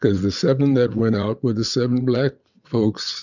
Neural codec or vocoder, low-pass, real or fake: vocoder, 22.05 kHz, 80 mel bands, WaveNeXt; 7.2 kHz; fake